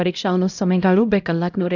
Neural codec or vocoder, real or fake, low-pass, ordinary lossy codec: codec, 16 kHz, 0.5 kbps, X-Codec, HuBERT features, trained on LibriSpeech; fake; 7.2 kHz; none